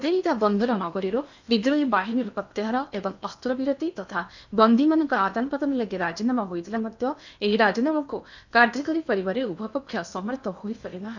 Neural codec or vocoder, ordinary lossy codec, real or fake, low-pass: codec, 16 kHz in and 24 kHz out, 0.8 kbps, FocalCodec, streaming, 65536 codes; none; fake; 7.2 kHz